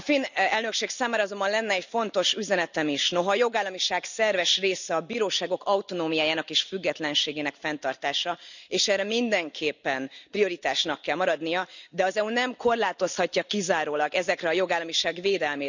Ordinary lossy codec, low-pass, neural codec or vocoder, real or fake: none; 7.2 kHz; none; real